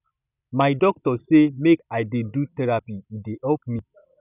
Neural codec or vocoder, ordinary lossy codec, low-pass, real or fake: none; none; 3.6 kHz; real